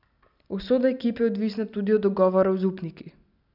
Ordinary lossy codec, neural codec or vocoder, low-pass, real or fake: none; none; 5.4 kHz; real